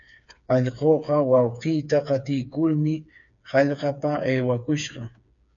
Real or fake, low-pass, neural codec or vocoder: fake; 7.2 kHz; codec, 16 kHz, 4 kbps, FreqCodec, smaller model